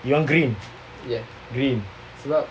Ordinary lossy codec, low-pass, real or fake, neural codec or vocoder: none; none; real; none